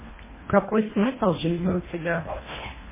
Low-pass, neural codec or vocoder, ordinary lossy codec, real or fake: 3.6 kHz; codec, 24 kHz, 1.5 kbps, HILCodec; MP3, 16 kbps; fake